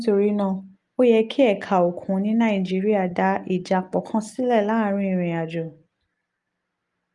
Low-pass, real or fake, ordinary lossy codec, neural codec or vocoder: 10.8 kHz; real; Opus, 32 kbps; none